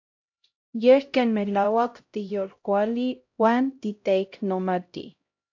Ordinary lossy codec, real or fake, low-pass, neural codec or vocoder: AAC, 48 kbps; fake; 7.2 kHz; codec, 16 kHz, 0.5 kbps, X-Codec, HuBERT features, trained on LibriSpeech